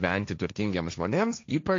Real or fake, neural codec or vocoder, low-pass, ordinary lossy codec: fake; codec, 16 kHz, 1.1 kbps, Voila-Tokenizer; 7.2 kHz; AAC, 48 kbps